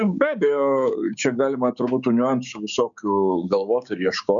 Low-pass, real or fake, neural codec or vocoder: 7.2 kHz; fake; codec, 16 kHz, 6 kbps, DAC